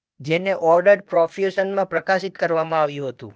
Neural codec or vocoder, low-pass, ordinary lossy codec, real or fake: codec, 16 kHz, 0.8 kbps, ZipCodec; none; none; fake